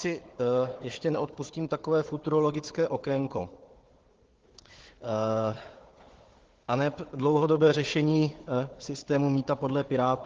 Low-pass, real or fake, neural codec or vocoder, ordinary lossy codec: 7.2 kHz; fake; codec, 16 kHz, 4 kbps, FunCodec, trained on Chinese and English, 50 frames a second; Opus, 16 kbps